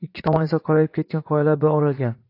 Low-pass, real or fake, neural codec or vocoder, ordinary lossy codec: 5.4 kHz; fake; vocoder, 44.1 kHz, 80 mel bands, Vocos; AAC, 32 kbps